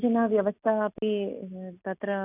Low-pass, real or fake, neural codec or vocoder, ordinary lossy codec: 3.6 kHz; real; none; none